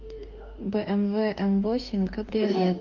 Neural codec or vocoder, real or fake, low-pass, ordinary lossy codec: autoencoder, 48 kHz, 32 numbers a frame, DAC-VAE, trained on Japanese speech; fake; 7.2 kHz; Opus, 32 kbps